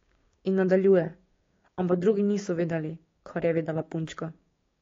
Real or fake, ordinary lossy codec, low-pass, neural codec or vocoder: fake; AAC, 32 kbps; 7.2 kHz; codec, 16 kHz, 6 kbps, DAC